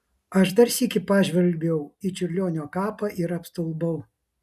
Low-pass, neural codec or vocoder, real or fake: 14.4 kHz; none; real